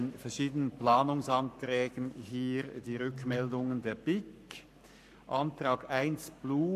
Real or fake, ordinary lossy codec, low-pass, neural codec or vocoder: fake; none; 14.4 kHz; codec, 44.1 kHz, 7.8 kbps, Pupu-Codec